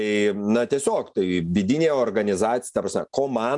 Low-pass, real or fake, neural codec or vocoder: 10.8 kHz; real; none